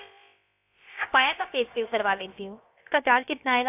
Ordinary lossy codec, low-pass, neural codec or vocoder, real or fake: AAC, 32 kbps; 3.6 kHz; codec, 16 kHz, about 1 kbps, DyCAST, with the encoder's durations; fake